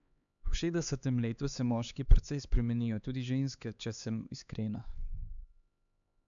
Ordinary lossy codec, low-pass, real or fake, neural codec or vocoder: none; 7.2 kHz; fake; codec, 16 kHz, 4 kbps, X-Codec, HuBERT features, trained on LibriSpeech